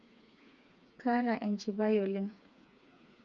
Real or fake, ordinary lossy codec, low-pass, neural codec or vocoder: fake; none; 7.2 kHz; codec, 16 kHz, 4 kbps, FreqCodec, smaller model